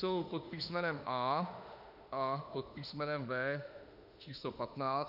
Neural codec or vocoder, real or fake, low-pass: autoencoder, 48 kHz, 32 numbers a frame, DAC-VAE, trained on Japanese speech; fake; 5.4 kHz